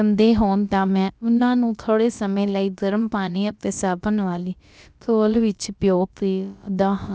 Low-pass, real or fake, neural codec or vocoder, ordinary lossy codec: none; fake; codec, 16 kHz, about 1 kbps, DyCAST, with the encoder's durations; none